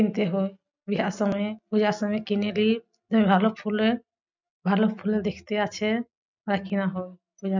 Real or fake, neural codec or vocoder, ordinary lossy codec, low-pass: real; none; none; 7.2 kHz